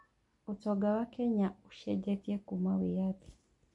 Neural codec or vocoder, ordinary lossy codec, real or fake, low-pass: none; MP3, 48 kbps; real; 10.8 kHz